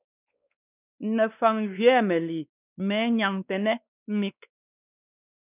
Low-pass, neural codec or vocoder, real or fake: 3.6 kHz; codec, 16 kHz, 2 kbps, X-Codec, WavLM features, trained on Multilingual LibriSpeech; fake